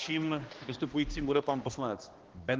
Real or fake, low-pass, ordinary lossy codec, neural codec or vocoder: fake; 7.2 kHz; Opus, 16 kbps; codec, 16 kHz, 1 kbps, X-Codec, HuBERT features, trained on balanced general audio